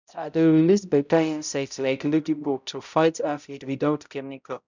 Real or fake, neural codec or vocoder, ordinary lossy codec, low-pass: fake; codec, 16 kHz, 0.5 kbps, X-Codec, HuBERT features, trained on balanced general audio; none; 7.2 kHz